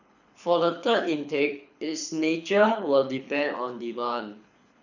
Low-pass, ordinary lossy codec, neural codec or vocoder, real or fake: 7.2 kHz; none; codec, 24 kHz, 6 kbps, HILCodec; fake